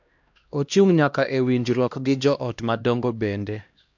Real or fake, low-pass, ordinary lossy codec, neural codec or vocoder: fake; 7.2 kHz; MP3, 64 kbps; codec, 16 kHz, 1 kbps, X-Codec, HuBERT features, trained on LibriSpeech